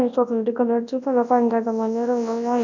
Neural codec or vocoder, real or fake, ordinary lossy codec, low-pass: codec, 24 kHz, 0.9 kbps, WavTokenizer, large speech release; fake; none; 7.2 kHz